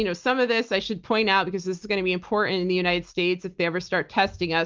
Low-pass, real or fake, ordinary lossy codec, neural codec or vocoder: 7.2 kHz; real; Opus, 32 kbps; none